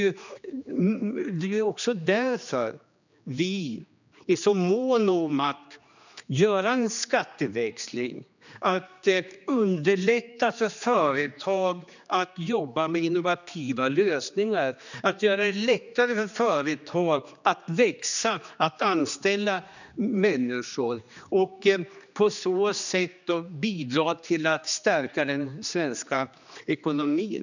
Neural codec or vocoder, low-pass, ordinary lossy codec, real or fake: codec, 16 kHz, 2 kbps, X-Codec, HuBERT features, trained on general audio; 7.2 kHz; none; fake